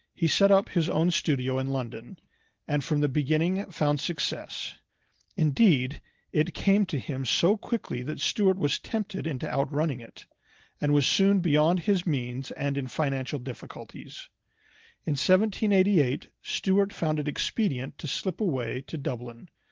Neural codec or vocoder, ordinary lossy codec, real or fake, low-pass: none; Opus, 16 kbps; real; 7.2 kHz